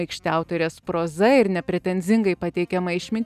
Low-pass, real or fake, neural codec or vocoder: 14.4 kHz; real; none